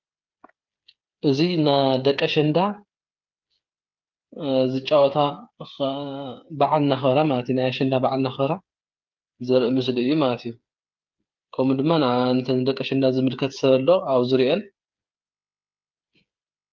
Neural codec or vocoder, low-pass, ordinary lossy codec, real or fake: codec, 16 kHz, 8 kbps, FreqCodec, smaller model; 7.2 kHz; Opus, 24 kbps; fake